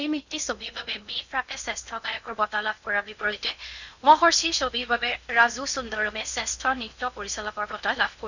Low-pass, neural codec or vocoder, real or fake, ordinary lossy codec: 7.2 kHz; codec, 16 kHz in and 24 kHz out, 0.8 kbps, FocalCodec, streaming, 65536 codes; fake; none